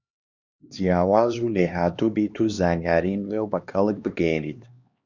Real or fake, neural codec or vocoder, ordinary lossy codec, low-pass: fake; codec, 16 kHz, 2 kbps, X-Codec, HuBERT features, trained on LibriSpeech; Opus, 64 kbps; 7.2 kHz